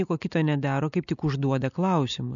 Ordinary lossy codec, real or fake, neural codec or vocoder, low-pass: MP3, 96 kbps; real; none; 7.2 kHz